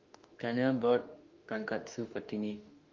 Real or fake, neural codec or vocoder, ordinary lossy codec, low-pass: fake; autoencoder, 48 kHz, 32 numbers a frame, DAC-VAE, trained on Japanese speech; Opus, 32 kbps; 7.2 kHz